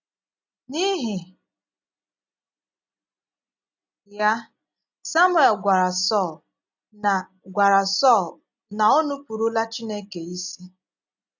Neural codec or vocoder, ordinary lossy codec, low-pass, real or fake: none; none; 7.2 kHz; real